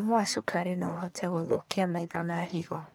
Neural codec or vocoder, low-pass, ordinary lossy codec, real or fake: codec, 44.1 kHz, 1.7 kbps, Pupu-Codec; none; none; fake